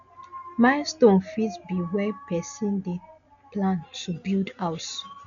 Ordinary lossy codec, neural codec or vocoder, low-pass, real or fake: none; none; 7.2 kHz; real